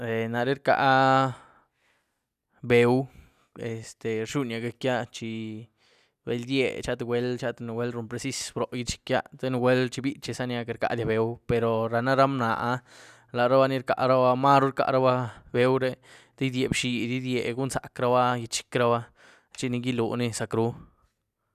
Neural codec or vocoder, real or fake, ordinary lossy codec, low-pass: none; real; none; 14.4 kHz